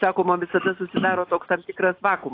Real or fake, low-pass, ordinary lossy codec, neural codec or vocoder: real; 5.4 kHz; AAC, 32 kbps; none